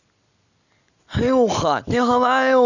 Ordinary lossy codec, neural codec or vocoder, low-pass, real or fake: none; none; 7.2 kHz; real